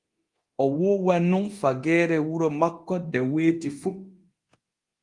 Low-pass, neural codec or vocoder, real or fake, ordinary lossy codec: 10.8 kHz; codec, 24 kHz, 0.9 kbps, DualCodec; fake; Opus, 24 kbps